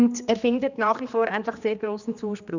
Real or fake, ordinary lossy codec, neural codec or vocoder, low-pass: fake; none; codec, 16 kHz, 2 kbps, X-Codec, HuBERT features, trained on general audio; 7.2 kHz